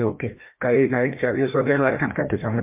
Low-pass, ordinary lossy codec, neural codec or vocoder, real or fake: 3.6 kHz; MP3, 32 kbps; codec, 16 kHz, 1 kbps, FreqCodec, larger model; fake